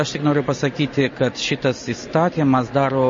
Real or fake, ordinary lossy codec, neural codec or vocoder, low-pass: real; MP3, 32 kbps; none; 7.2 kHz